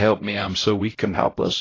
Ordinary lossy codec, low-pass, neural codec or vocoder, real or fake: AAC, 32 kbps; 7.2 kHz; codec, 16 kHz, 0.5 kbps, X-Codec, HuBERT features, trained on LibriSpeech; fake